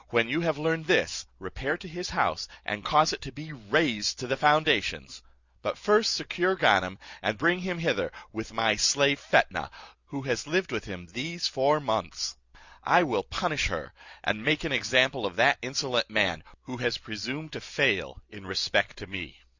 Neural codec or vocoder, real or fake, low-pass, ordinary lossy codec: none; real; 7.2 kHz; Opus, 64 kbps